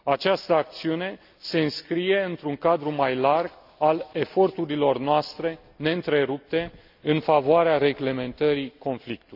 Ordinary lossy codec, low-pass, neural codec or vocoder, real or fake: AAC, 48 kbps; 5.4 kHz; none; real